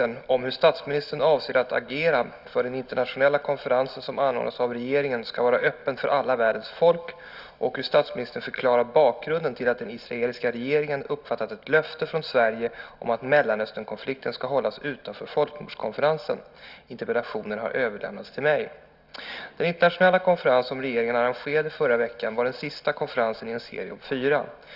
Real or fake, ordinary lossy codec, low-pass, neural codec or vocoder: real; Opus, 64 kbps; 5.4 kHz; none